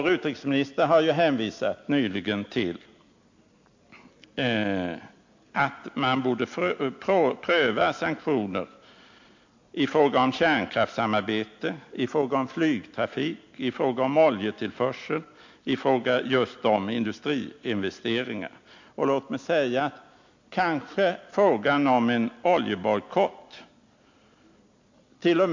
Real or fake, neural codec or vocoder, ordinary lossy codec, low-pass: real; none; MP3, 48 kbps; 7.2 kHz